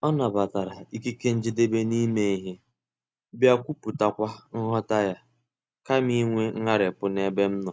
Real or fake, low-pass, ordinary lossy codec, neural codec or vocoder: real; none; none; none